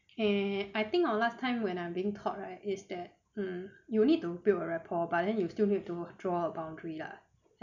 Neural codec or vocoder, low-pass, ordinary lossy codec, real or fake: none; 7.2 kHz; none; real